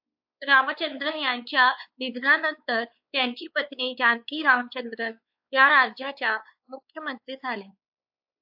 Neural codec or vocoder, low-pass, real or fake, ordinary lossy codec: codec, 16 kHz, 4 kbps, X-Codec, WavLM features, trained on Multilingual LibriSpeech; 5.4 kHz; fake; AAC, 48 kbps